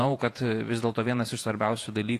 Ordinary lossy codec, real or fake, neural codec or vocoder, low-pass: AAC, 48 kbps; fake; vocoder, 48 kHz, 128 mel bands, Vocos; 14.4 kHz